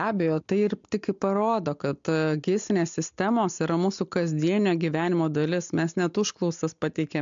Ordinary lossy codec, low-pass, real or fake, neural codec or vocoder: MP3, 64 kbps; 7.2 kHz; real; none